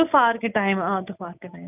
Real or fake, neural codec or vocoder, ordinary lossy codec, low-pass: real; none; none; 3.6 kHz